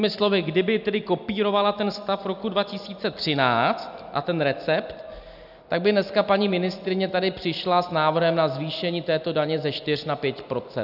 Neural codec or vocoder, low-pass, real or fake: none; 5.4 kHz; real